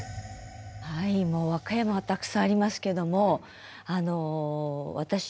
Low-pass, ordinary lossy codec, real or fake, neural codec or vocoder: none; none; real; none